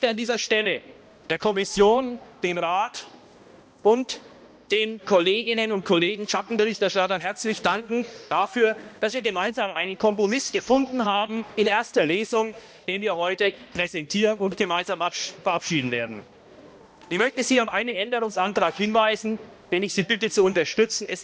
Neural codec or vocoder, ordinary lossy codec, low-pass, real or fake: codec, 16 kHz, 1 kbps, X-Codec, HuBERT features, trained on balanced general audio; none; none; fake